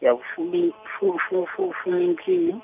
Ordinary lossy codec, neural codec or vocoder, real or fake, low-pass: none; none; real; 3.6 kHz